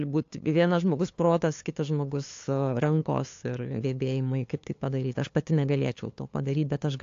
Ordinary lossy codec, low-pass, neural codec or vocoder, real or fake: AAC, 48 kbps; 7.2 kHz; codec, 16 kHz, 2 kbps, FunCodec, trained on LibriTTS, 25 frames a second; fake